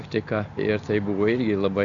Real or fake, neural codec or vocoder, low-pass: real; none; 7.2 kHz